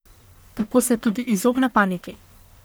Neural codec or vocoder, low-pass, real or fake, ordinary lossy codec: codec, 44.1 kHz, 1.7 kbps, Pupu-Codec; none; fake; none